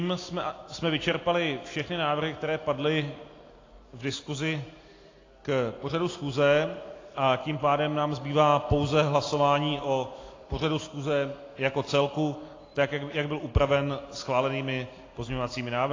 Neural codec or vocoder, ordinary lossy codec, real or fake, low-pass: none; AAC, 32 kbps; real; 7.2 kHz